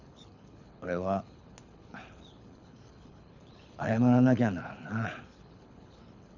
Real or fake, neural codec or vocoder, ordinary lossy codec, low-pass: fake; codec, 24 kHz, 6 kbps, HILCodec; none; 7.2 kHz